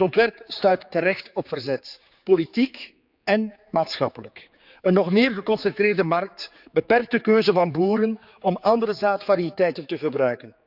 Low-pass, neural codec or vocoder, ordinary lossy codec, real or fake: 5.4 kHz; codec, 16 kHz, 4 kbps, X-Codec, HuBERT features, trained on general audio; none; fake